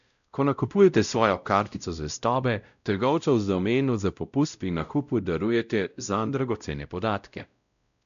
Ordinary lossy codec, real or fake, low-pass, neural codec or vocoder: none; fake; 7.2 kHz; codec, 16 kHz, 0.5 kbps, X-Codec, WavLM features, trained on Multilingual LibriSpeech